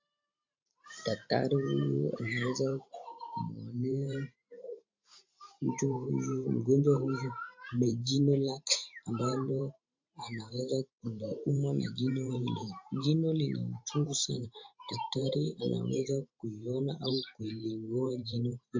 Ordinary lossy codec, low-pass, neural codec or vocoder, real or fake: MP3, 64 kbps; 7.2 kHz; vocoder, 44.1 kHz, 128 mel bands every 512 samples, BigVGAN v2; fake